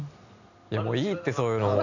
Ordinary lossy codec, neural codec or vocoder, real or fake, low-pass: none; none; real; 7.2 kHz